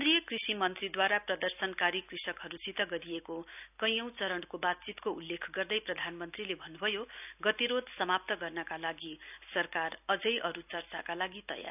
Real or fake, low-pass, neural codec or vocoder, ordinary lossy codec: real; 3.6 kHz; none; none